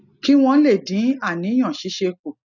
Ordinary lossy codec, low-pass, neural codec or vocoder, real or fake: none; 7.2 kHz; none; real